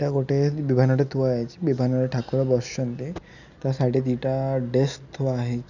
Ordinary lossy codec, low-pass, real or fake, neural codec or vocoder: none; 7.2 kHz; real; none